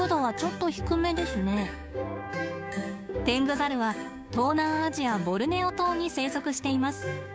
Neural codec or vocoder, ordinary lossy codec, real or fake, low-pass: codec, 16 kHz, 6 kbps, DAC; none; fake; none